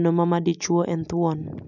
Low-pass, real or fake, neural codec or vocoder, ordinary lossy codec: 7.2 kHz; real; none; none